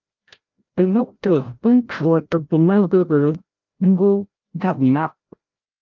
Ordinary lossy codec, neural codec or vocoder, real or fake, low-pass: Opus, 32 kbps; codec, 16 kHz, 0.5 kbps, FreqCodec, larger model; fake; 7.2 kHz